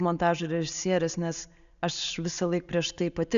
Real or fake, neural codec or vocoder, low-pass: real; none; 7.2 kHz